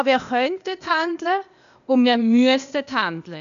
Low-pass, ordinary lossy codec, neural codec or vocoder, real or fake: 7.2 kHz; none; codec, 16 kHz, 0.8 kbps, ZipCodec; fake